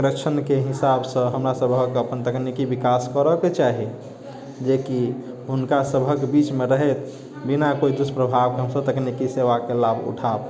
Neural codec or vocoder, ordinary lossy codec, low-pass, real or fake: none; none; none; real